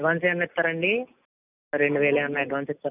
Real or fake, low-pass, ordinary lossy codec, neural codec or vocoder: real; 3.6 kHz; none; none